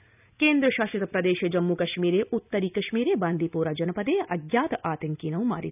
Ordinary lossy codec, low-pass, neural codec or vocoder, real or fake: none; 3.6 kHz; none; real